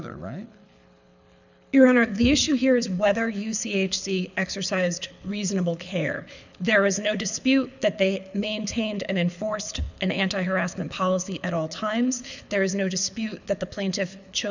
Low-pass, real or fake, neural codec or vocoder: 7.2 kHz; fake; codec, 24 kHz, 6 kbps, HILCodec